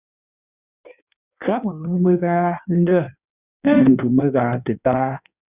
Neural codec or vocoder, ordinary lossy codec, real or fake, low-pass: codec, 16 kHz in and 24 kHz out, 1.1 kbps, FireRedTTS-2 codec; Opus, 64 kbps; fake; 3.6 kHz